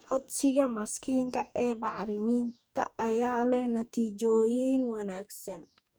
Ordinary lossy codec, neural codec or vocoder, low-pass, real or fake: none; codec, 44.1 kHz, 2.6 kbps, DAC; none; fake